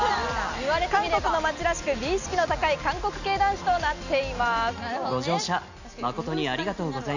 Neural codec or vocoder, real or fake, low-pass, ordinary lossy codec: none; real; 7.2 kHz; none